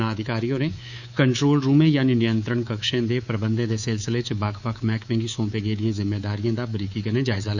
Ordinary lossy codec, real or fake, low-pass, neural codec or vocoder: none; fake; 7.2 kHz; codec, 24 kHz, 3.1 kbps, DualCodec